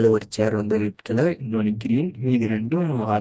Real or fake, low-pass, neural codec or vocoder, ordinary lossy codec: fake; none; codec, 16 kHz, 1 kbps, FreqCodec, smaller model; none